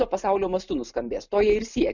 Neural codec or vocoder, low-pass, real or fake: none; 7.2 kHz; real